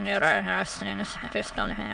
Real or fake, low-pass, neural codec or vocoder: fake; 9.9 kHz; autoencoder, 22.05 kHz, a latent of 192 numbers a frame, VITS, trained on many speakers